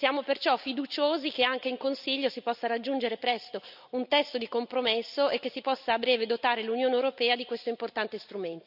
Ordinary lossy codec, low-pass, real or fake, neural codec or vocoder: none; 5.4 kHz; real; none